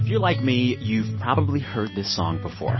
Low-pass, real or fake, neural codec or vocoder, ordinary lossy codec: 7.2 kHz; real; none; MP3, 24 kbps